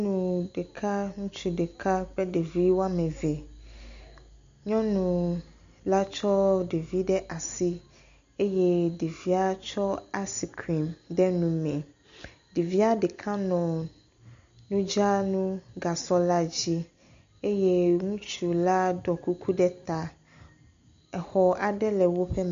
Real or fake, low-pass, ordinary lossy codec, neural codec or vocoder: real; 7.2 kHz; MP3, 64 kbps; none